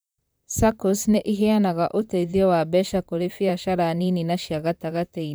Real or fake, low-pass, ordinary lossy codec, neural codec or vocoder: fake; none; none; vocoder, 44.1 kHz, 128 mel bands, Pupu-Vocoder